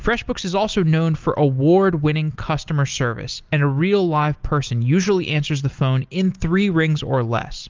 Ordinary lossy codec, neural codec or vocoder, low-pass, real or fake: Opus, 32 kbps; none; 7.2 kHz; real